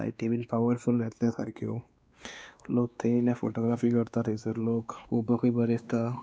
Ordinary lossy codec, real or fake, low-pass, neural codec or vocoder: none; fake; none; codec, 16 kHz, 2 kbps, X-Codec, WavLM features, trained on Multilingual LibriSpeech